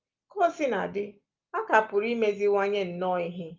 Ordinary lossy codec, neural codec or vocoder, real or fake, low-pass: Opus, 24 kbps; none; real; 7.2 kHz